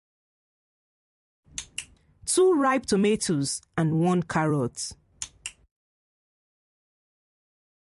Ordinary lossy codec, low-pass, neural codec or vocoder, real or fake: MP3, 48 kbps; 14.4 kHz; vocoder, 44.1 kHz, 128 mel bands every 256 samples, BigVGAN v2; fake